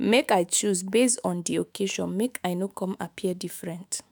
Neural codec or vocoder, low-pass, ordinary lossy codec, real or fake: autoencoder, 48 kHz, 128 numbers a frame, DAC-VAE, trained on Japanese speech; none; none; fake